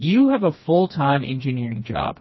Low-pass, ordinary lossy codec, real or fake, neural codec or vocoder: 7.2 kHz; MP3, 24 kbps; fake; codec, 16 kHz, 2 kbps, FreqCodec, smaller model